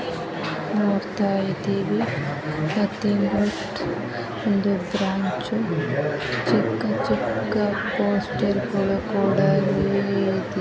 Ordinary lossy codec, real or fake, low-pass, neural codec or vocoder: none; real; none; none